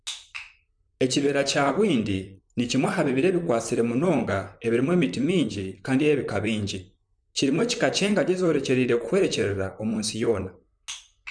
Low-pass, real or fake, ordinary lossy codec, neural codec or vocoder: 9.9 kHz; fake; none; vocoder, 44.1 kHz, 128 mel bands, Pupu-Vocoder